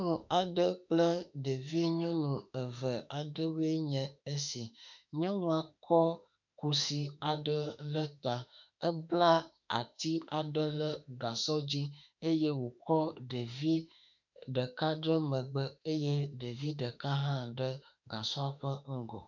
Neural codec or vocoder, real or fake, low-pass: autoencoder, 48 kHz, 32 numbers a frame, DAC-VAE, trained on Japanese speech; fake; 7.2 kHz